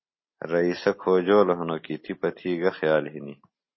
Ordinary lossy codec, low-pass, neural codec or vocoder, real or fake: MP3, 24 kbps; 7.2 kHz; none; real